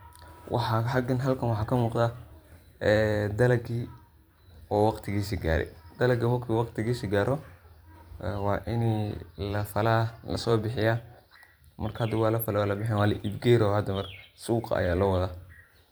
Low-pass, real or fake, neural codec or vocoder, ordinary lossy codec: none; fake; vocoder, 44.1 kHz, 128 mel bands every 512 samples, BigVGAN v2; none